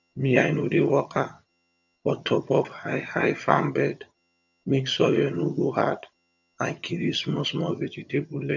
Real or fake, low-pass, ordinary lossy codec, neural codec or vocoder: fake; 7.2 kHz; none; vocoder, 22.05 kHz, 80 mel bands, HiFi-GAN